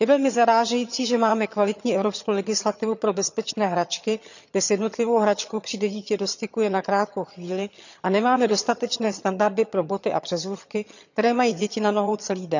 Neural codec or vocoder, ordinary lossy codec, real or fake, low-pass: vocoder, 22.05 kHz, 80 mel bands, HiFi-GAN; none; fake; 7.2 kHz